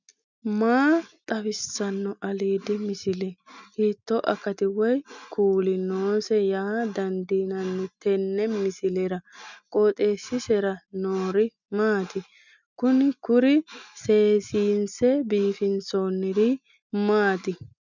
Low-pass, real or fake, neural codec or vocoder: 7.2 kHz; real; none